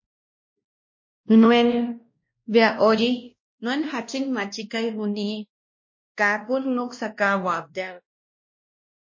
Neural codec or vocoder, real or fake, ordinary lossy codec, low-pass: codec, 16 kHz, 1 kbps, X-Codec, WavLM features, trained on Multilingual LibriSpeech; fake; MP3, 32 kbps; 7.2 kHz